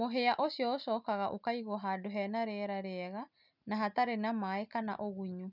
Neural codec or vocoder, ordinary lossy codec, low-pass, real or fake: none; none; 5.4 kHz; real